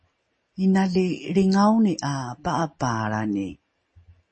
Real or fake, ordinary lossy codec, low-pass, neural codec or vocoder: real; MP3, 32 kbps; 9.9 kHz; none